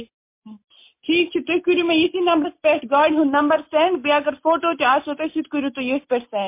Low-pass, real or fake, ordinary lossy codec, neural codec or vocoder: 3.6 kHz; real; MP3, 24 kbps; none